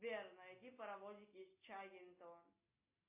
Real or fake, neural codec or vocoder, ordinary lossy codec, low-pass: real; none; MP3, 24 kbps; 3.6 kHz